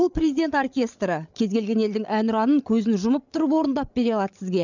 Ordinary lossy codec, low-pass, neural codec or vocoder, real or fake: none; 7.2 kHz; codec, 16 kHz, 8 kbps, FreqCodec, larger model; fake